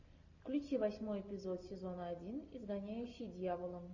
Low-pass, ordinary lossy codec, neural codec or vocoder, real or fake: 7.2 kHz; AAC, 48 kbps; none; real